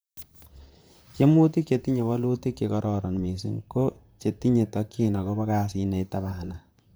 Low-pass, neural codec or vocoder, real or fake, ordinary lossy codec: none; vocoder, 44.1 kHz, 128 mel bands, Pupu-Vocoder; fake; none